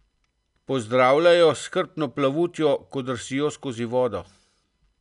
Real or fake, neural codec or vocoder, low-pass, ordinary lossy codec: real; none; 10.8 kHz; none